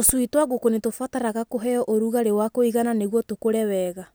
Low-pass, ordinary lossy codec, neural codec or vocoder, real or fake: none; none; none; real